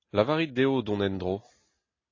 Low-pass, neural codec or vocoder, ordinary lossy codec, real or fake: 7.2 kHz; none; AAC, 32 kbps; real